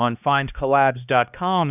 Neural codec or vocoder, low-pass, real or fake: codec, 16 kHz, 1 kbps, X-Codec, HuBERT features, trained on balanced general audio; 3.6 kHz; fake